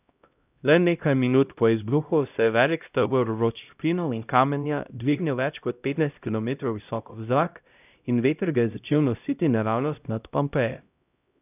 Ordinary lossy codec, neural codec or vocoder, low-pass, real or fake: none; codec, 16 kHz, 0.5 kbps, X-Codec, HuBERT features, trained on LibriSpeech; 3.6 kHz; fake